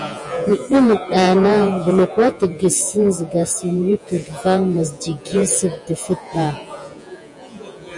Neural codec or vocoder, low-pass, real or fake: vocoder, 48 kHz, 128 mel bands, Vocos; 10.8 kHz; fake